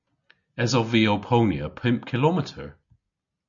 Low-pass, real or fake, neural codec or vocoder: 7.2 kHz; real; none